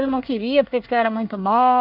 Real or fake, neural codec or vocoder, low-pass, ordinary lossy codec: fake; codec, 24 kHz, 1 kbps, SNAC; 5.4 kHz; none